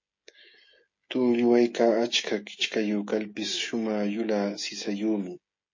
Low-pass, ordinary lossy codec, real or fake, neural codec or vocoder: 7.2 kHz; MP3, 32 kbps; fake; codec, 16 kHz, 16 kbps, FreqCodec, smaller model